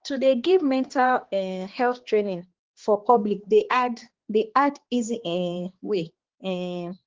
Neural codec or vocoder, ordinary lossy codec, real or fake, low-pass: codec, 16 kHz, 2 kbps, X-Codec, HuBERT features, trained on balanced general audio; Opus, 16 kbps; fake; 7.2 kHz